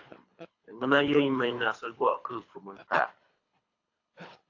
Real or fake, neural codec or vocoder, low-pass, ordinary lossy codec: fake; codec, 24 kHz, 3 kbps, HILCodec; 7.2 kHz; AAC, 48 kbps